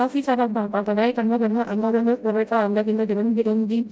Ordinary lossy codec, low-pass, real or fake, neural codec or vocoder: none; none; fake; codec, 16 kHz, 0.5 kbps, FreqCodec, smaller model